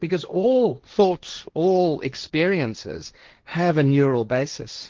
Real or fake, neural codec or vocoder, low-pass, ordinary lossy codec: fake; codec, 16 kHz, 1.1 kbps, Voila-Tokenizer; 7.2 kHz; Opus, 32 kbps